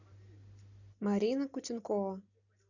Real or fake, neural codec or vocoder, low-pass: real; none; 7.2 kHz